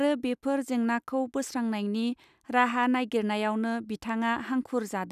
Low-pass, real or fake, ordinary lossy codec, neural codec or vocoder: 14.4 kHz; real; none; none